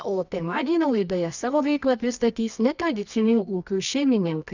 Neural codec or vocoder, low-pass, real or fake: codec, 24 kHz, 0.9 kbps, WavTokenizer, medium music audio release; 7.2 kHz; fake